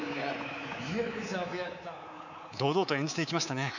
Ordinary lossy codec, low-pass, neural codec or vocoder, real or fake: none; 7.2 kHz; codec, 24 kHz, 3.1 kbps, DualCodec; fake